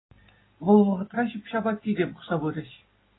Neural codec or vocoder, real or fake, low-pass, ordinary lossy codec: none; real; 7.2 kHz; AAC, 16 kbps